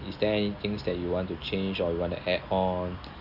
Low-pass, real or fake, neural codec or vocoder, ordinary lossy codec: 5.4 kHz; real; none; none